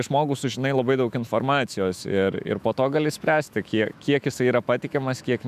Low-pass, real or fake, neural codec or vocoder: 14.4 kHz; fake; autoencoder, 48 kHz, 128 numbers a frame, DAC-VAE, trained on Japanese speech